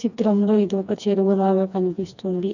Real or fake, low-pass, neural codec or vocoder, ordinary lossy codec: fake; 7.2 kHz; codec, 16 kHz, 2 kbps, FreqCodec, smaller model; none